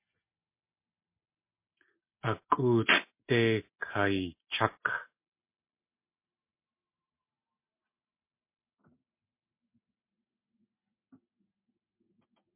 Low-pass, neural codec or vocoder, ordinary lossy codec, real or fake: 3.6 kHz; none; MP3, 24 kbps; real